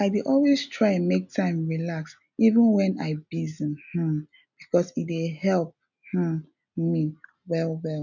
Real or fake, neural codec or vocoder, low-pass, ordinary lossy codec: real; none; 7.2 kHz; none